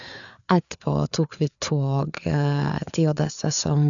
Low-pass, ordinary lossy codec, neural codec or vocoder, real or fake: 7.2 kHz; none; codec, 16 kHz, 4 kbps, FreqCodec, larger model; fake